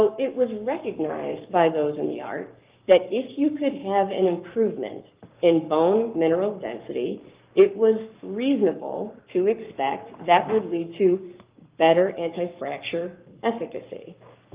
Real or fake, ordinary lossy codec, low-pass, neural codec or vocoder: fake; Opus, 32 kbps; 3.6 kHz; codec, 44.1 kHz, 7.8 kbps, Pupu-Codec